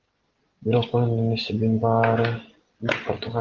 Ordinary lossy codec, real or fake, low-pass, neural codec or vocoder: Opus, 16 kbps; real; 7.2 kHz; none